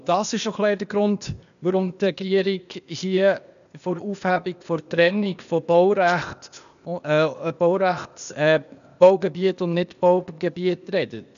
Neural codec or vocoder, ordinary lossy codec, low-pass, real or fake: codec, 16 kHz, 0.8 kbps, ZipCodec; none; 7.2 kHz; fake